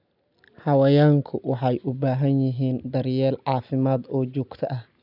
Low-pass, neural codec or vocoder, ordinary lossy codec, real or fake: 5.4 kHz; none; none; real